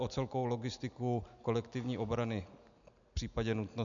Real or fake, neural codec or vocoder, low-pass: real; none; 7.2 kHz